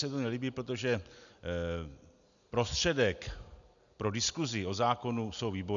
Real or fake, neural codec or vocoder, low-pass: real; none; 7.2 kHz